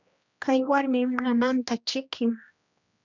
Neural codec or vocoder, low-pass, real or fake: codec, 16 kHz, 1 kbps, X-Codec, HuBERT features, trained on general audio; 7.2 kHz; fake